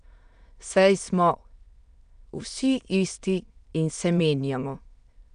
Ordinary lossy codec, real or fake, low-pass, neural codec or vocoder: none; fake; 9.9 kHz; autoencoder, 22.05 kHz, a latent of 192 numbers a frame, VITS, trained on many speakers